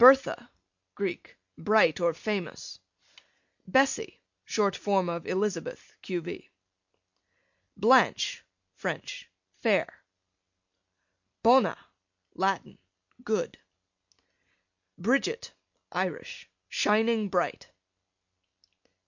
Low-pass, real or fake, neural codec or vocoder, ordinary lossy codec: 7.2 kHz; real; none; MP3, 48 kbps